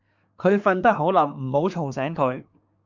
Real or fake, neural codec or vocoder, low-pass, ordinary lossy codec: fake; codec, 24 kHz, 1 kbps, SNAC; 7.2 kHz; MP3, 64 kbps